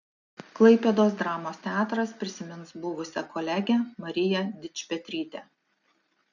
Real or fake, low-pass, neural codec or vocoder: real; 7.2 kHz; none